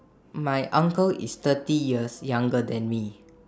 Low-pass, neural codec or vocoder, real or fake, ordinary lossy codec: none; none; real; none